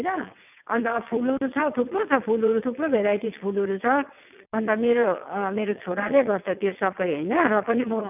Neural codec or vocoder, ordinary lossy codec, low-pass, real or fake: vocoder, 22.05 kHz, 80 mel bands, WaveNeXt; none; 3.6 kHz; fake